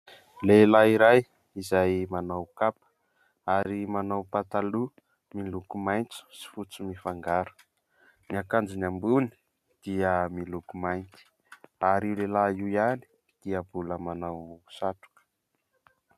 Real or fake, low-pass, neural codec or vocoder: real; 14.4 kHz; none